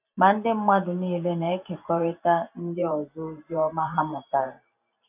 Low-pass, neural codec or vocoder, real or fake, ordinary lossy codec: 3.6 kHz; vocoder, 44.1 kHz, 128 mel bands every 512 samples, BigVGAN v2; fake; none